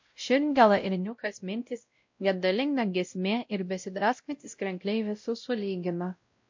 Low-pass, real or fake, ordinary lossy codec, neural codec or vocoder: 7.2 kHz; fake; MP3, 48 kbps; codec, 16 kHz, 0.5 kbps, X-Codec, WavLM features, trained on Multilingual LibriSpeech